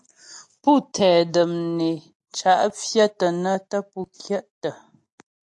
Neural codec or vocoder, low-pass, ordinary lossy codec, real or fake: none; 10.8 kHz; AAC, 64 kbps; real